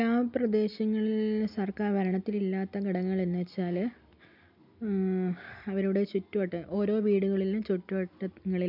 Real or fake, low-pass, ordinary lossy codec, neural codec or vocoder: real; 5.4 kHz; none; none